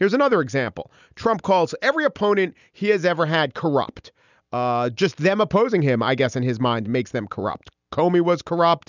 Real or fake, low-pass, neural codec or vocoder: real; 7.2 kHz; none